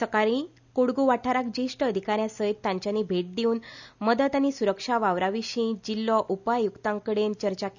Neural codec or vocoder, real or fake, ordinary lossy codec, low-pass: none; real; none; 7.2 kHz